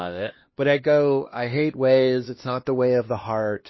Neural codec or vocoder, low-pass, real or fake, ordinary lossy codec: codec, 16 kHz, 1 kbps, X-Codec, HuBERT features, trained on LibriSpeech; 7.2 kHz; fake; MP3, 24 kbps